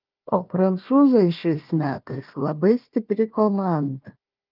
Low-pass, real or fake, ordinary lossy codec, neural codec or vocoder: 5.4 kHz; fake; Opus, 24 kbps; codec, 16 kHz, 1 kbps, FunCodec, trained on Chinese and English, 50 frames a second